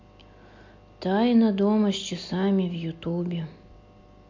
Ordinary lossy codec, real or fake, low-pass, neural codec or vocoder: MP3, 48 kbps; real; 7.2 kHz; none